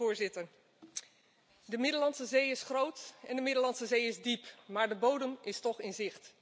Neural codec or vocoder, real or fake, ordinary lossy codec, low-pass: none; real; none; none